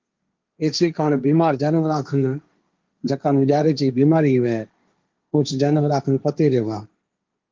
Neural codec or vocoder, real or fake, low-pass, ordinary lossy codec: codec, 16 kHz, 1.1 kbps, Voila-Tokenizer; fake; 7.2 kHz; Opus, 32 kbps